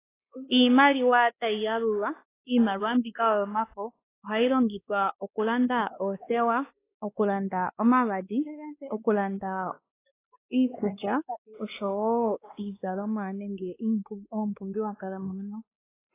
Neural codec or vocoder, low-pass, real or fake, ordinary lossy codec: codec, 16 kHz, 2 kbps, X-Codec, WavLM features, trained on Multilingual LibriSpeech; 3.6 kHz; fake; AAC, 24 kbps